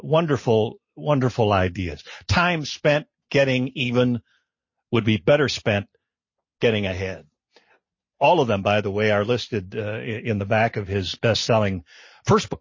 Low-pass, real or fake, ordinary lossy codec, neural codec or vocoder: 7.2 kHz; real; MP3, 32 kbps; none